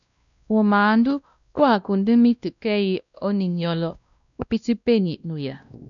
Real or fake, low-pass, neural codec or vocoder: fake; 7.2 kHz; codec, 16 kHz, 1 kbps, X-Codec, WavLM features, trained on Multilingual LibriSpeech